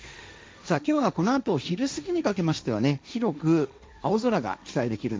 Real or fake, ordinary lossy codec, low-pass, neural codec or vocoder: fake; none; none; codec, 16 kHz, 1.1 kbps, Voila-Tokenizer